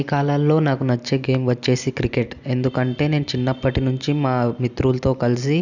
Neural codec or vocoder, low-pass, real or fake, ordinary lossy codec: none; 7.2 kHz; real; none